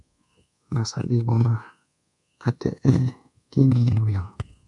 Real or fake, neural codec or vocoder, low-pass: fake; codec, 24 kHz, 1.2 kbps, DualCodec; 10.8 kHz